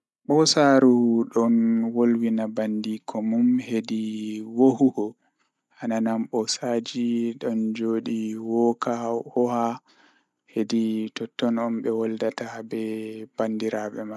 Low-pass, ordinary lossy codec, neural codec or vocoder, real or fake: none; none; none; real